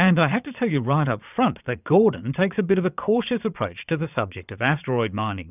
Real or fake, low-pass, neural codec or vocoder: fake; 3.6 kHz; vocoder, 22.05 kHz, 80 mel bands, Vocos